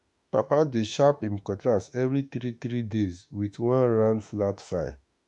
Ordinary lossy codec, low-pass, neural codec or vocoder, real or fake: MP3, 96 kbps; 10.8 kHz; autoencoder, 48 kHz, 32 numbers a frame, DAC-VAE, trained on Japanese speech; fake